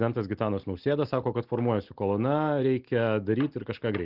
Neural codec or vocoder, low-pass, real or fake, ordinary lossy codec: none; 5.4 kHz; real; Opus, 16 kbps